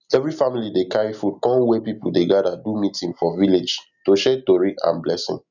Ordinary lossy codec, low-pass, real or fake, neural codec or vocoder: none; 7.2 kHz; real; none